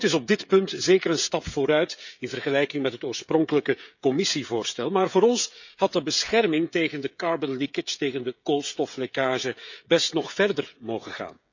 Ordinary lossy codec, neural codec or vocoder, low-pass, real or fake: none; codec, 16 kHz, 16 kbps, FreqCodec, smaller model; 7.2 kHz; fake